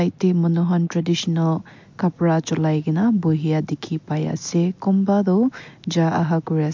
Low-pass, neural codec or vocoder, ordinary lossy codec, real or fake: 7.2 kHz; none; MP3, 48 kbps; real